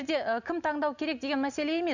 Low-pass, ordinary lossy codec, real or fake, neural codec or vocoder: 7.2 kHz; none; real; none